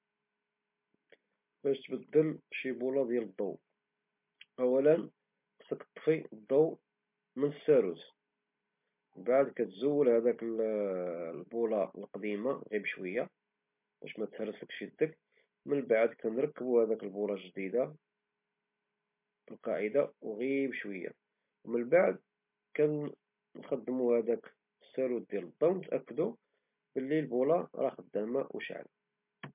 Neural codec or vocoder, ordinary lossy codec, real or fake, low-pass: none; MP3, 32 kbps; real; 3.6 kHz